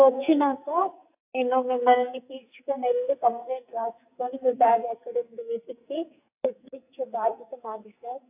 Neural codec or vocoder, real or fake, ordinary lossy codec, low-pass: codec, 44.1 kHz, 2.6 kbps, SNAC; fake; AAC, 32 kbps; 3.6 kHz